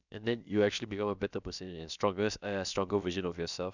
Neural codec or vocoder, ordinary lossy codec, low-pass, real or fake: codec, 16 kHz, about 1 kbps, DyCAST, with the encoder's durations; none; 7.2 kHz; fake